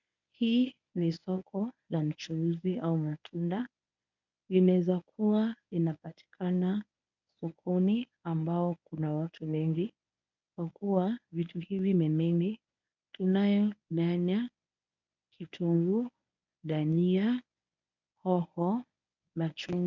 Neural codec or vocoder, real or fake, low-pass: codec, 24 kHz, 0.9 kbps, WavTokenizer, medium speech release version 1; fake; 7.2 kHz